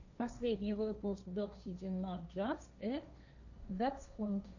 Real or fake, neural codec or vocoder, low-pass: fake; codec, 16 kHz, 1.1 kbps, Voila-Tokenizer; 7.2 kHz